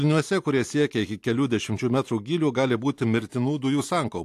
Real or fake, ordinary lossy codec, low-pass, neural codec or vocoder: real; AAC, 64 kbps; 14.4 kHz; none